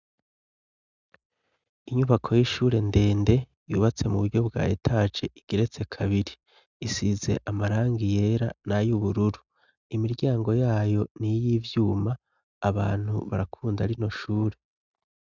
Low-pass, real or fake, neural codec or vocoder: 7.2 kHz; real; none